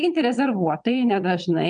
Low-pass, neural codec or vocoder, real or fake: 9.9 kHz; vocoder, 22.05 kHz, 80 mel bands, WaveNeXt; fake